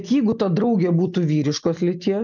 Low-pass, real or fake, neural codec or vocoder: 7.2 kHz; real; none